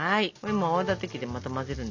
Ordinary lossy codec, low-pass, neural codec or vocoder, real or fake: MP3, 64 kbps; 7.2 kHz; none; real